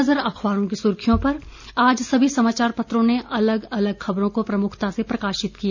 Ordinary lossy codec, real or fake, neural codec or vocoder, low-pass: none; real; none; 7.2 kHz